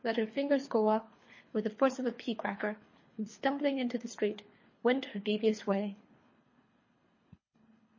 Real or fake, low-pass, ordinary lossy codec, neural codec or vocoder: fake; 7.2 kHz; MP3, 32 kbps; codec, 24 kHz, 3 kbps, HILCodec